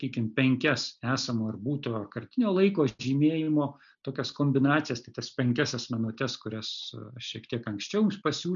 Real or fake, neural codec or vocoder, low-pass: real; none; 7.2 kHz